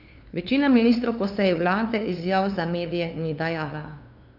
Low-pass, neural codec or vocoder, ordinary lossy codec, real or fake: 5.4 kHz; codec, 16 kHz, 2 kbps, FunCodec, trained on LibriTTS, 25 frames a second; none; fake